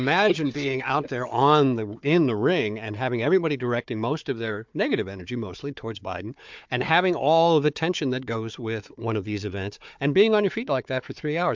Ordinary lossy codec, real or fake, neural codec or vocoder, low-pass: MP3, 64 kbps; fake; codec, 16 kHz, 4 kbps, FunCodec, trained on Chinese and English, 50 frames a second; 7.2 kHz